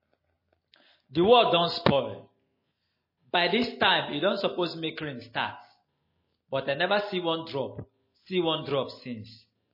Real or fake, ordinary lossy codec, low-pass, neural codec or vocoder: real; MP3, 24 kbps; 5.4 kHz; none